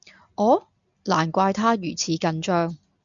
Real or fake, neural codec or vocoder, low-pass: real; none; 7.2 kHz